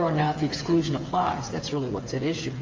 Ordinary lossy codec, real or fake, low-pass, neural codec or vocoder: Opus, 32 kbps; fake; 7.2 kHz; codec, 16 kHz, 8 kbps, FreqCodec, smaller model